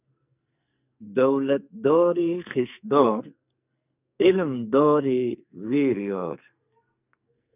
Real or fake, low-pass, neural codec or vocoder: fake; 3.6 kHz; codec, 44.1 kHz, 2.6 kbps, SNAC